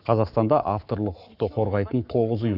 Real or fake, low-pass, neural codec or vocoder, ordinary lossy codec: fake; 5.4 kHz; codec, 44.1 kHz, 7.8 kbps, Pupu-Codec; none